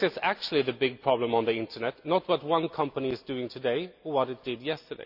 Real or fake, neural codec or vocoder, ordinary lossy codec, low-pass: real; none; none; 5.4 kHz